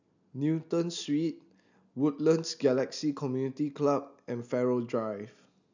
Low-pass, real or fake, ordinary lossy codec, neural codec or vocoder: 7.2 kHz; real; none; none